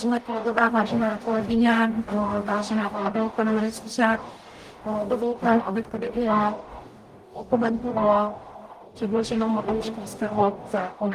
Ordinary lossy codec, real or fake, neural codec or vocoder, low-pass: Opus, 24 kbps; fake; codec, 44.1 kHz, 0.9 kbps, DAC; 14.4 kHz